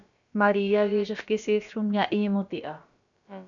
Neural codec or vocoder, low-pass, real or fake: codec, 16 kHz, about 1 kbps, DyCAST, with the encoder's durations; 7.2 kHz; fake